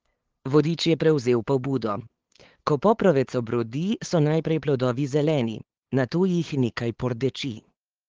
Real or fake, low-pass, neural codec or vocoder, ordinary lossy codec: fake; 7.2 kHz; codec, 16 kHz, 8 kbps, FunCodec, trained on LibriTTS, 25 frames a second; Opus, 16 kbps